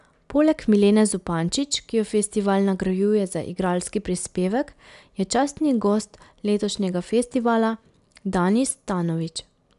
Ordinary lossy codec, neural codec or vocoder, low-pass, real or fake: none; none; 10.8 kHz; real